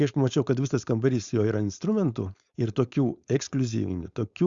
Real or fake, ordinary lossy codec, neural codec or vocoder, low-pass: fake; Opus, 64 kbps; codec, 16 kHz, 4.8 kbps, FACodec; 7.2 kHz